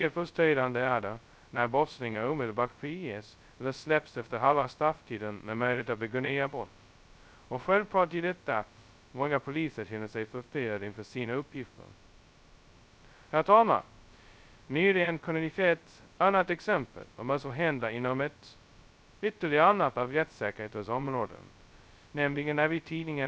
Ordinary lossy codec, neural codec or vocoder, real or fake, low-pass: none; codec, 16 kHz, 0.2 kbps, FocalCodec; fake; none